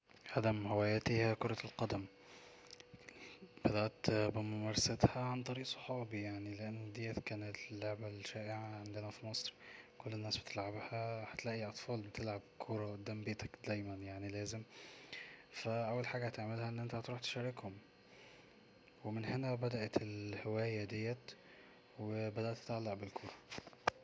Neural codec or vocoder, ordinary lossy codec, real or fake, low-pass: none; none; real; none